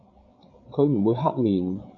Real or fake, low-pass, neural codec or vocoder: fake; 7.2 kHz; codec, 16 kHz, 8 kbps, FreqCodec, larger model